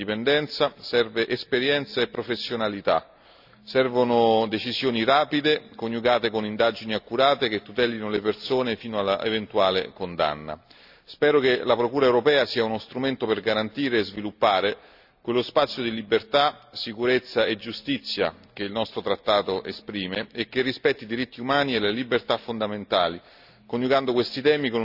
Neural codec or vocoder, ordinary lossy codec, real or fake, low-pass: none; none; real; 5.4 kHz